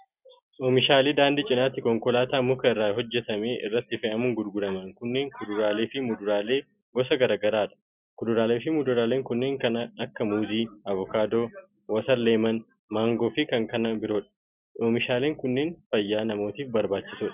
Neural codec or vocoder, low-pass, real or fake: none; 3.6 kHz; real